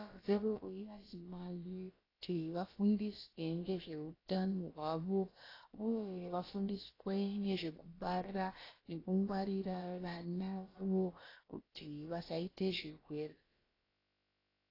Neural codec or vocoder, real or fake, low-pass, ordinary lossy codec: codec, 16 kHz, about 1 kbps, DyCAST, with the encoder's durations; fake; 5.4 kHz; AAC, 24 kbps